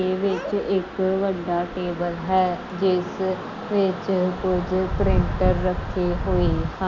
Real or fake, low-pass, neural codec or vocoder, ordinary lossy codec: real; 7.2 kHz; none; none